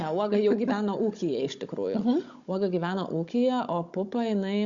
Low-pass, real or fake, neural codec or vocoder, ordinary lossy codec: 7.2 kHz; fake; codec, 16 kHz, 16 kbps, FunCodec, trained on Chinese and English, 50 frames a second; Opus, 64 kbps